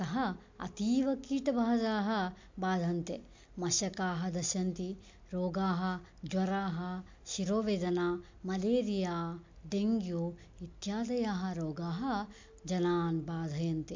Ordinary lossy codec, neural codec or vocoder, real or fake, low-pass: MP3, 48 kbps; none; real; 7.2 kHz